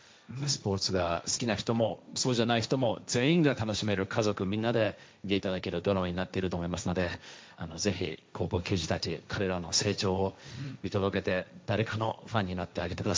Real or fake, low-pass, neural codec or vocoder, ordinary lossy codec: fake; none; codec, 16 kHz, 1.1 kbps, Voila-Tokenizer; none